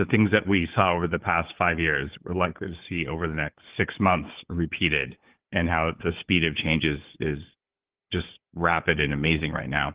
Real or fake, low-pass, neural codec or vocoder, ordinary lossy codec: fake; 3.6 kHz; codec, 16 kHz, 4 kbps, FunCodec, trained on Chinese and English, 50 frames a second; Opus, 16 kbps